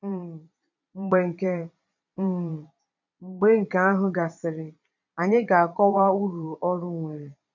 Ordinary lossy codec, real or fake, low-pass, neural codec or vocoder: none; fake; 7.2 kHz; vocoder, 22.05 kHz, 80 mel bands, Vocos